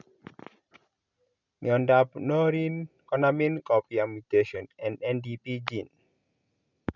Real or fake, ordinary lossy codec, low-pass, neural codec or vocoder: real; none; 7.2 kHz; none